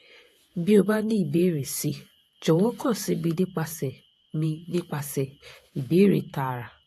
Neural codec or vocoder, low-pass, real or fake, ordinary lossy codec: vocoder, 48 kHz, 128 mel bands, Vocos; 14.4 kHz; fake; AAC, 64 kbps